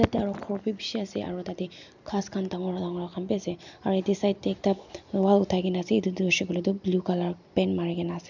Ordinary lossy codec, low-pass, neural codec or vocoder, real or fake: none; 7.2 kHz; none; real